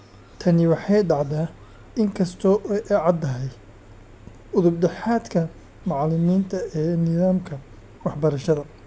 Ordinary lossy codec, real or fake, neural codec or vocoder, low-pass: none; real; none; none